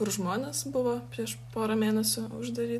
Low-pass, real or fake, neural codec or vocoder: 14.4 kHz; real; none